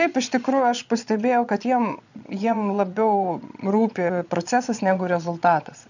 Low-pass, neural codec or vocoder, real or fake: 7.2 kHz; vocoder, 22.05 kHz, 80 mel bands, Vocos; fake